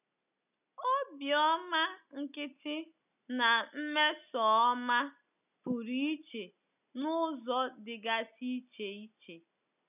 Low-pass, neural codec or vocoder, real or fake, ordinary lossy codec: 3.6 kHz; none; real; none